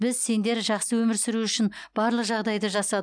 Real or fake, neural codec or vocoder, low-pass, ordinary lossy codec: real; none; 9.9 kHz; none